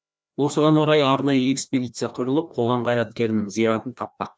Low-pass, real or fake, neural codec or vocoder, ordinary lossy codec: none; fake; codec, 16 kHz, 1 kbps, FreqCodec, larger model; none